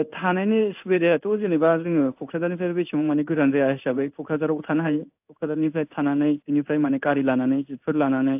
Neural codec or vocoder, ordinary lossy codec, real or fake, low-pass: codec, 16 kHz in and 24 kHz out, 1 kbps, XY-Tokenizer; AAC, 32 kbps; fake; 3.6 kHz